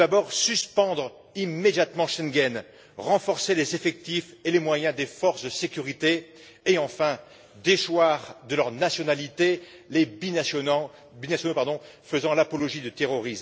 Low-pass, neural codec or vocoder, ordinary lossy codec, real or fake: none; none; none; real